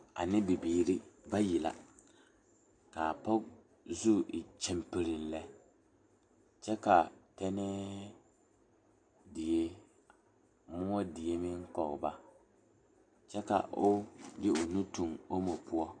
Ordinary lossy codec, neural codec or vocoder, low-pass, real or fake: AAC, 64 kbps; none; 9.9 kHz; real